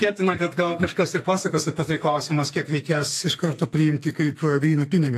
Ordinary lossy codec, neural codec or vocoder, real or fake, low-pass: AAC, 64 kbps; codec, 32 kHz, 1.9 kbps, SNAC; fake; 14.4 kHz